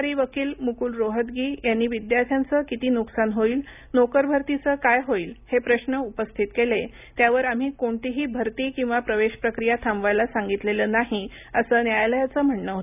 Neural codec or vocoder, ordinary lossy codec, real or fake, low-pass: none; none; real; 3.6 kHz